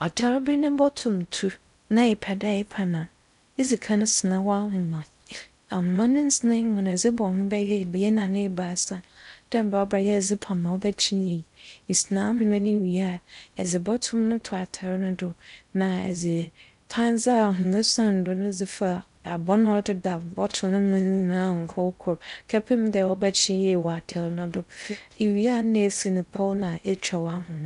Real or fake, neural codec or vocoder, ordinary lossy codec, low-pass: fake; codec, 16 kHz in and 24 kHz out, 0.6 kbps, FocalCodec, streaming, 4096 codes; none; 10.8 kHz